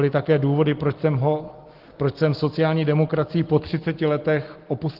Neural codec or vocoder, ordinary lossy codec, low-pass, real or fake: none; Opus, 16 kbps; 5.4 kHz; real